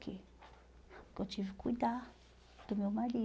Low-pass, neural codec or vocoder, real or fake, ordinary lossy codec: none; none; real; none